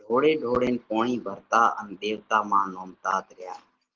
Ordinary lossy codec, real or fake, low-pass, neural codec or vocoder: Opus, 16 kbps; real; 7.2 kHz; none